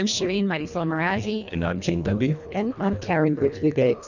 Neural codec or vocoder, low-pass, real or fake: codec, 24 kHz, 1.5 kbps, HILCodec; 7.2 kHz; fake